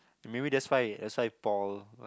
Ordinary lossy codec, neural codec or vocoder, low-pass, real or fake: none; none; none; real